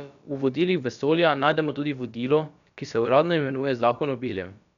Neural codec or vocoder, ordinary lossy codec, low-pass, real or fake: codec, 16 kHz, about 1 kbps, DyCAST, with the encoder's durations; none; 7.2 kHz; fake